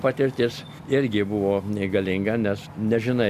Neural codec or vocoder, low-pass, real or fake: none; 14.4 kHz; real